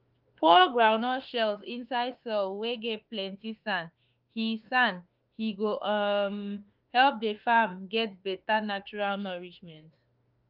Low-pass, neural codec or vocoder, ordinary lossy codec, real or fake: 5.4 kHz; autoencoder, 48 kHz, 32 numbers a frame, DAC-VAE, trained on Japanese speech; Opus, 32 kbps; fake